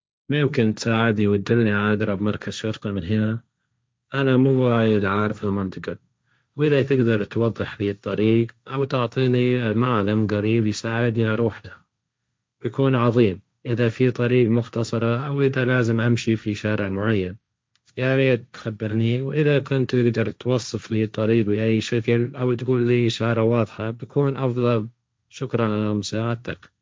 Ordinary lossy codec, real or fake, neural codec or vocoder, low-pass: none; fake; codec, 16 kHz, 1.1 kbps, Voila-Tokenizer; none